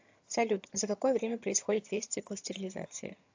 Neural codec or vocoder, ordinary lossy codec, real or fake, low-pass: vocoder, 22.05 kHz, 80 mel bands, HiFi-GAN; AAC, 48 kbps; fake; 7.2 kHz